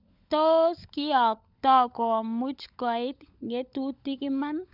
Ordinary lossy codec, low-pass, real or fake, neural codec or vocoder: none; 5.4 kHz; fake; codec, 16 kHz, 16 kbps, FunCodec, trained on LibriTTS, 50 frames a second